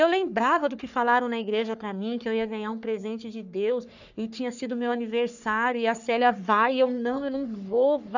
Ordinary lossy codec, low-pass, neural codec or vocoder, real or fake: none; 7.2 kHz; codec, 44.1 kHz, 3.4 kbps, Pupu-Codec; fake